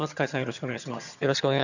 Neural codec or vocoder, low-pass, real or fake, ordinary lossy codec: vocoder, 22.05 kHz, 80 mel bands, HiFi-GAN; 7.2 kHz; fake; none